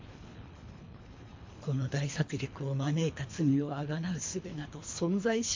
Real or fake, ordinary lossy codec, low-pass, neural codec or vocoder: fake; MP3, 48 kbps; 7.2 kHz; codec, 24 kHz, 3 kbps, HILCodec